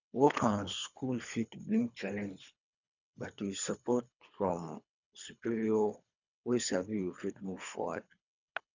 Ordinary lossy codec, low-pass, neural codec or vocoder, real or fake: none; 7.2 kHz; codec, 24 kHz, 3 kbps, HILCodec; fake